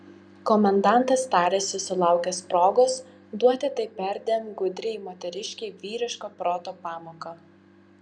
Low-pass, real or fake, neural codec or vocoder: 9.9 kHz; real; none